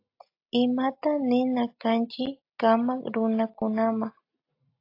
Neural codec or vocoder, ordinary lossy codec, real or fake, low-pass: none; AAC, 32 kbps; real; 5.4 kHz